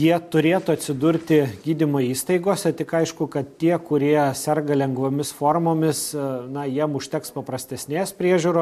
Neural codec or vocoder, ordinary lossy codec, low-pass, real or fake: none; MP3, 64 kbps; 14.4 kHz; real